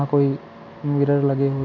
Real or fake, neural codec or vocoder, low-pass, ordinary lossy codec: real; none; 7.2 kHz; none